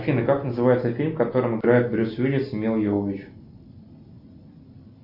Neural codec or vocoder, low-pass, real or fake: none; 5.4 kHz; real